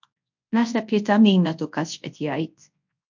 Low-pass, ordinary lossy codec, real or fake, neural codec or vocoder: 7.2 kHz; MP3, 48 kbps; fake; codec, 24 kHz, 0.9 kbps, WavTokenizer, large speech release